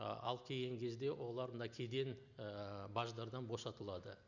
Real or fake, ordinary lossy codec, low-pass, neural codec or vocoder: real; none; 7.2 kHz; none